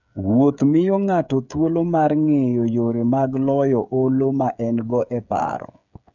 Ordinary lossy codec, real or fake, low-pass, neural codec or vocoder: none; fake; 7.2 kHz; codec, 16 kHz, 8 kbps, FreqCodec, smaller model